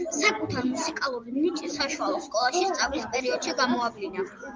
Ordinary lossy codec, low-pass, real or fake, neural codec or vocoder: Opus, 32 kbps; 7.2 kHz; real; none